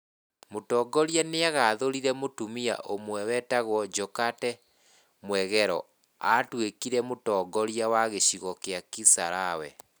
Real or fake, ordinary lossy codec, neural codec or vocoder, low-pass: real; none; none; none